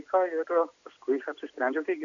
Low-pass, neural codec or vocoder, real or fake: 7.2 kHz; none; real